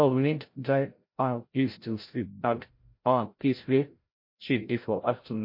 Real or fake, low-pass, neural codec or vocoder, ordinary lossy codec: fake; 5.4 kHz; codec, 16 kHz, 0.5 kbps, FreqCodec, larger model; MP3, 32 kbps